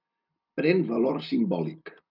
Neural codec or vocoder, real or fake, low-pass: none; real; 5.4 kHz